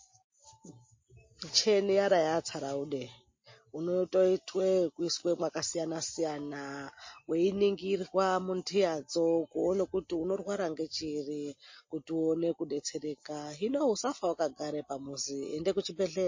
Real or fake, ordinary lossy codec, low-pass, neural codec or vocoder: real; MP3, 32 kbps; 7.2 kHz; none